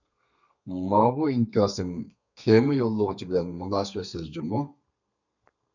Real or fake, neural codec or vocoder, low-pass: fake; codec, 32 kHz, 1.9 kbps, SNAC; 7.2 kHz